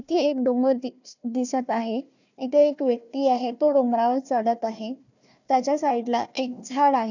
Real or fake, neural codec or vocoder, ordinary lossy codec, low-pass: fake; codec, 16 kHz, 1 kbps, FunCodec, trained on Chinese and English, 50 frames a second; none; 7.2 kHz